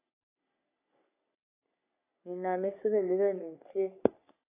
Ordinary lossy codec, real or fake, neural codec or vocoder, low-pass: AAC, 24 kbps; fake; codec, 44.1 kHz, 3.4 kbps, Pupu-Codec; 3.6 kHz